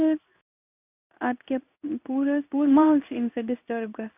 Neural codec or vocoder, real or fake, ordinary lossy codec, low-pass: codec, 16 kHz in and 24 kHz out, 1 kbps, XY-Tokenizer; fake; none; 3.6 kHz